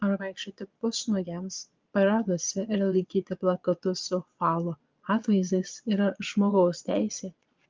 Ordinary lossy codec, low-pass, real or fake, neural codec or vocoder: Opus, 32 kbps; 7.2 kHz; fake; vocoder, 22.05 kHz, 80 mel bands, Vocos